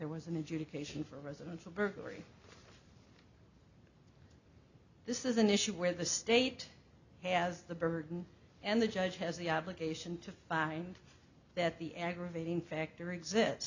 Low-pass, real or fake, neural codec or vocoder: 7.2 kHz; real; none